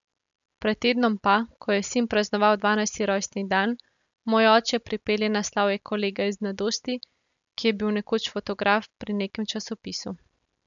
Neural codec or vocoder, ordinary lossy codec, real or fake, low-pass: none; none; real; 7.2 kHz